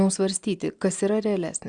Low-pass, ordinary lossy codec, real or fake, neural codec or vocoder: 9.9 kHz; Opus, 64 kbps; real; none